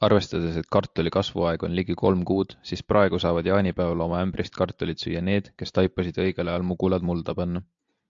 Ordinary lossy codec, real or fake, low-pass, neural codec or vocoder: AAC, 64 kbps; real; 7.2 kHz; none